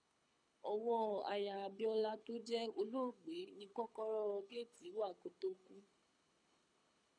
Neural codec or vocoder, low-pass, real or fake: codec, 24 kHz, 6 kbps, HILCodec; 9.9 kHz; fake